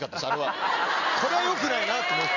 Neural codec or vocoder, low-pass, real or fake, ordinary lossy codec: none; 7.2 kHz; real; none